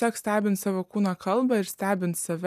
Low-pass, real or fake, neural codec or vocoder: 14.4 kHz; real; none